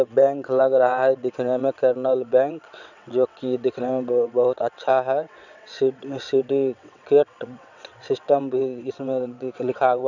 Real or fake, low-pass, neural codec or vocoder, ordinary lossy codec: fake; 7.2 kHz; vocoder, 22.05 kHz, 80 mel bands, WaveNeXt; none